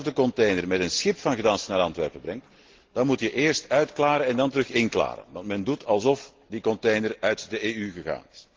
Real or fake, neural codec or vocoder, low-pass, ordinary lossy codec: real; none; 7.2 kHz; Opus, 16 kbps